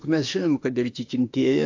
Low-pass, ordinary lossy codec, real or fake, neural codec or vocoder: 7.2 kHz; AAC, 48 kbps; fake; autoencoder, 48 kHz, 32 numbers a frame, DAC-VAE, trained on Japanese speech